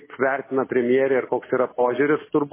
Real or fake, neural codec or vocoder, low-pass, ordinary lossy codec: real; none; 3.6 kHz; MP3, 16 kbps